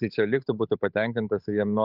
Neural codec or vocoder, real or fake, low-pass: codec, 16 kHz, 8 kbps, FunCodec, trained on Chinese and English, 25 frames a second; fake; 5.4 kHz